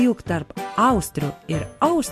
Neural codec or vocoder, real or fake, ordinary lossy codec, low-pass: none; real; MP3, 64 kbps; 14.4 kHz